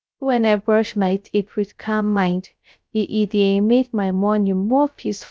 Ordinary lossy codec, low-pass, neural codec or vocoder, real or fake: none; none; codec, 16 kHz, 0.3 kbps, FocalCodec; fake